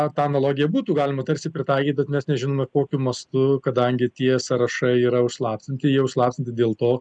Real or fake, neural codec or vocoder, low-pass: real; none; 9.9 kHz